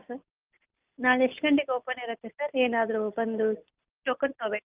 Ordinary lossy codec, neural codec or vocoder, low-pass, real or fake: Opus, 32 kbps; none; 3.6 kHz; real